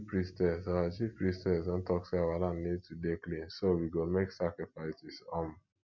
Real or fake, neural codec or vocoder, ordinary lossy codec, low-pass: real; none; none; 7.2 kHz